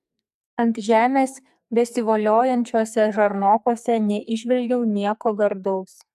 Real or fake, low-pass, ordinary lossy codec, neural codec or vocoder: fake; 14.4 kHz; AAC, 96 kbps; codec, 44.1 kHz, 2.6 kbps, SNAC